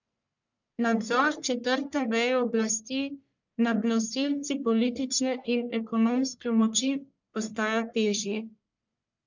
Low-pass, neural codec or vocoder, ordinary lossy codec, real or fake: 7.2 kHz; codec, 44.1 kHz, 1.7 kbps, Pupu-Codec; none; fake